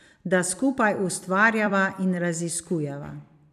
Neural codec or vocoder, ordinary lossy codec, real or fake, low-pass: vocoder, 44.1 kHz, 128 mel bands every 256 samples, BigVGAN v2; none; fake; 14.4 kHz